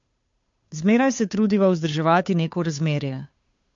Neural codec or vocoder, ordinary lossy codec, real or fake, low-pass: codec, 16 kHz, 2 kbps, FunCodec, trained on Chinese and English, 25 frames a second; MP3, 48 kbps; fake; 7.2 kHz